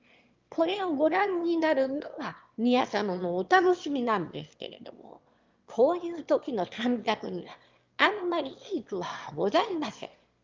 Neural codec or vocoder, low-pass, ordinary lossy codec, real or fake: autoencoder, 22.05 kHz, a latent of 192 numbers a frame, VITS, trained on one speaker; 7.2 kHz; Opus, 24 kbps; fake